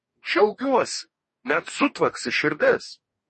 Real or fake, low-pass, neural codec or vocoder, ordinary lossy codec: fake; 10.8 kHz; codec, 44.1 kHz, 2.6 kbps, DAC; MP3, 32 kbps